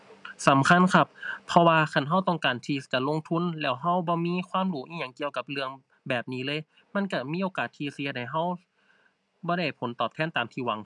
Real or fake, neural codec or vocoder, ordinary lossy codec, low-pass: real; none; none; 10.8 kHz